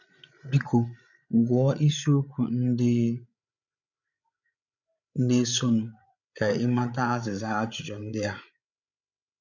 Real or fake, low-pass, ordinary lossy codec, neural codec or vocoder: fake; 7.2 kHz; none; codec, 16 kHz, 8 kbps, FreqCodec, larger model